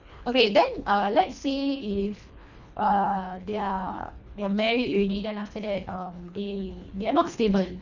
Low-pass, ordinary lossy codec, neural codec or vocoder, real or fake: 7.2 kHz; none; codec, 24 kHz, 1.5 kbps, HILCodec; fake